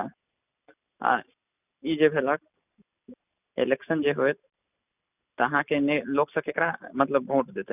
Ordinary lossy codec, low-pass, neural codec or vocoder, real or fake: none; 3.6 kHz; none; real